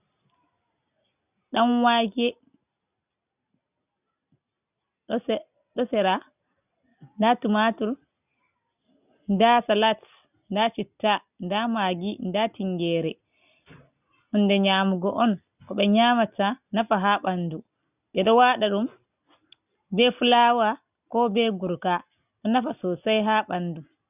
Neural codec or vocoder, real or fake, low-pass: none; real; 3.6 kHz